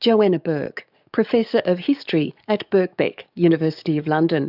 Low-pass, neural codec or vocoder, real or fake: 5.4 kHz; codec, 44.1 kHz, 7.8 kbps, DAC; fake